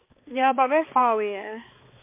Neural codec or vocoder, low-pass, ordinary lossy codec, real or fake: codec, 16 kHz, 2 kbps, X-Codec, HuBERT features, trained on balanced general audio; 3.6 kHz; MP3, 24 kbps; fake